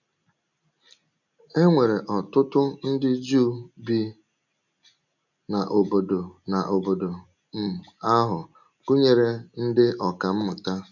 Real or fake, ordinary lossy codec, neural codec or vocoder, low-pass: real; none; none; 7.2 kHz